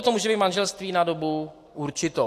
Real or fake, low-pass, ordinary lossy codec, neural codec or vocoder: real; 14.4 kHz; AAC, 64 kbps; none